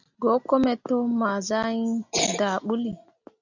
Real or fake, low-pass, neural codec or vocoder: real; 7.2 kHz; none